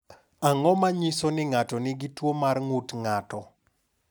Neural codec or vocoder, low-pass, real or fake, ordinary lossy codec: none; none; real; none